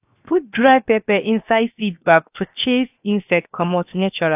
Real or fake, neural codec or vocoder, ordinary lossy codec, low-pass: fake; codec, 16 kHz, 0.7 kbps, FocalCodec; AAC, 32 kbps; 3.6 kHz